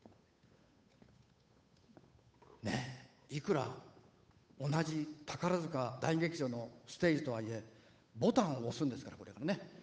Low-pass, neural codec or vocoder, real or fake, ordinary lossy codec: none; codec, 16 kHz, 8 kbps, FunCodec, trained on Chinese and English, 25 frames a second; fake; none